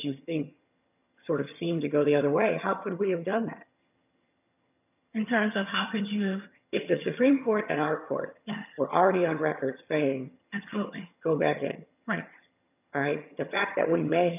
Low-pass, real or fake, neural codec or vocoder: 3.6 kHz; fake; vocoder, 22.05 kHz, 80 mel bands, HiFi-GAN